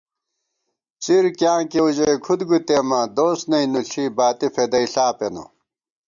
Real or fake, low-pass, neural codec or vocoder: real; 7.2 kHz; none